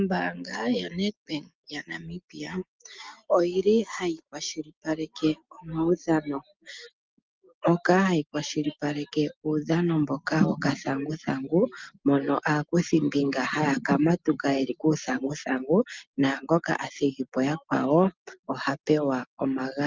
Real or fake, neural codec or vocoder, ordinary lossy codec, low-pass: real; none; Opus, 24 kbps; 7.2 kHz